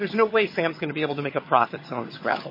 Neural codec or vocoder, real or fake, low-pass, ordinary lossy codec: vocoder, 22.05 kHz, 80 mel bands, HiFi-GAN; fake; 5.4 kHz; MP3, 24 kbps